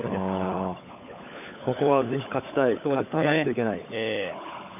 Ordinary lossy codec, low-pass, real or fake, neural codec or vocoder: none; 3.6 kHz; fake; codec, 16 kHz, 16 kbps, FunCodec, trained on LibriTTS, 50 frames a second